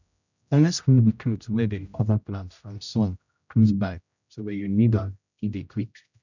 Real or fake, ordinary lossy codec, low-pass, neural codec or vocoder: fake; none; 7.2 kHz; codec, 16 kHz, 0.5 kbps, X-Codec, HuBERT features, trained on general audio